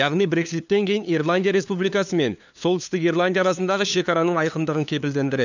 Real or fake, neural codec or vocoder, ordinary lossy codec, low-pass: fake; codec, 16 kHz, 2 kbps, FunCodec, trained on LibriTTS, 25 frames a second; none; 7.2 kHz